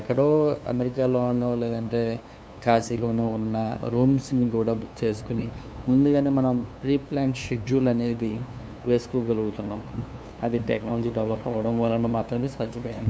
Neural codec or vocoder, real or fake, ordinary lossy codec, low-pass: codec, 16 kHz, 2 kbps, FunCodec, trained on LibriTTS, 25 frames a second; fake; none; none